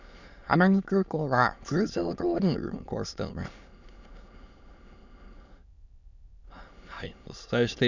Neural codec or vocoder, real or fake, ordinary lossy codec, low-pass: autoencoder, 22.05 kHz, a latent of 192 numbers a frame, VITS, trained on many speakers; fake; none; 7.2 kHz